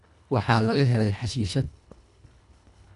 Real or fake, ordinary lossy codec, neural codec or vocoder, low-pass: fake; none; codec, 24 kHz, 1.5 kbps, HILCodec; 10.8 kHz